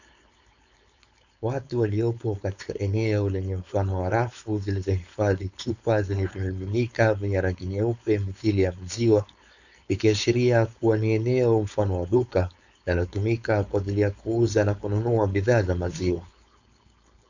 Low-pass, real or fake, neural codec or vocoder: 7.2 kHz; fake; codec, 16 kHz, 4.8 kbps, FACodec